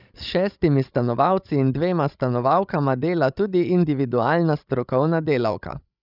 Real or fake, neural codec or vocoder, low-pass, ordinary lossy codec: fake; codec, 16 kHz, 16 kbps, FreqCodec, larger model; 5.4 kHz; none